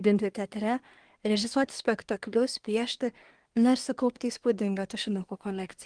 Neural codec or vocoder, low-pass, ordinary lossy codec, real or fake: codec, 24 kHz, 1 kbps, SNAC; 9.9 kHz; Opus, 24 kbps; fake